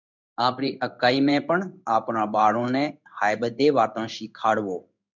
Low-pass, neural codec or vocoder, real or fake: 7.2 kHz; codec, 16 kHz in and 24 kHz out, 1 kbps, XY-Tokenizer; fake